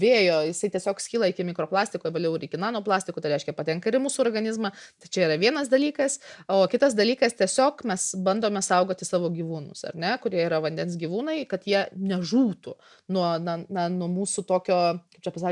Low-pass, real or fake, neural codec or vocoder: 10.8 kHz; real; none